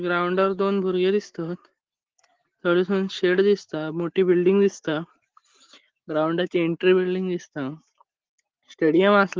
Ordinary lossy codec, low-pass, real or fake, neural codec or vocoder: Opus, 16 kbps; 7.2 kHz; real; none